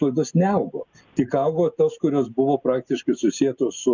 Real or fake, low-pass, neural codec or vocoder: real; 7.2 kHz; none